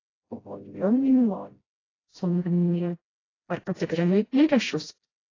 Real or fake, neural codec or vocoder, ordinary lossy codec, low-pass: fake; codec, 16 kHz, 0.5 kbps, FreqCodec, smaller model; AAC, 32 kbps; 7.2 kHz